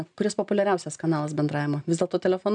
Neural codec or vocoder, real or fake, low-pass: none; real; 9.9 kHz